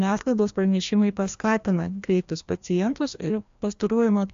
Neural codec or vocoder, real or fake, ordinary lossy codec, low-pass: codec, 16 kHz, 1 kbps, FreqCodec, larger model; fake; AAC, 64 kbps; 7.2 kHz